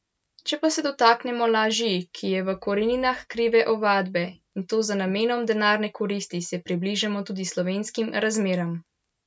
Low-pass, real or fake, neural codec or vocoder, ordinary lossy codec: none; real; none; none